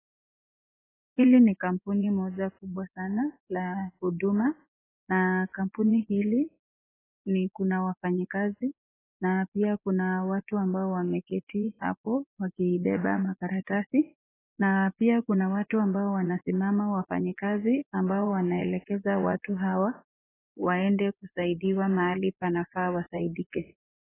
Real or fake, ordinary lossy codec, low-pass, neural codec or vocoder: fake; AAC, 16 kbps; 3.6 kHz; vocoder, 24 kHz, 100 mel bands, Vocos